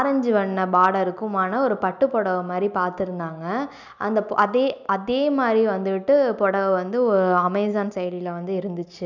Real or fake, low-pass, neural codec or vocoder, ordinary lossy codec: real; 7.2 kHz; none; none